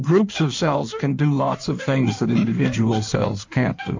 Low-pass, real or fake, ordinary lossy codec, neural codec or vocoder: 7.2 kHz; fake; MP3, 48 kbps; codec, 16 kHz in and 24 kHz out, 1.1 kbps, FireRedTTS-2 codec